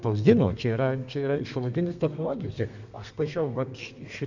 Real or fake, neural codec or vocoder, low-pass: fake; codec, 32 kHz, 1.9 kbps, SNAC; 7.2 kHz